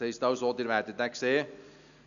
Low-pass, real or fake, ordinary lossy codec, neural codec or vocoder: 7.2 kHz; real; none; none